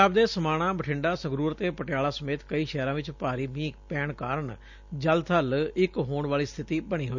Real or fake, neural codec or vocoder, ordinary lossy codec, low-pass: real; none; none; 7.2 kHz